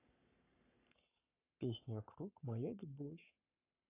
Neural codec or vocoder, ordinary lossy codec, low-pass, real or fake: codec, 44.1 kHz, 7.8 kbps, Pupu-Codec; AAC, 24 kbps; 3.6 kHz; fake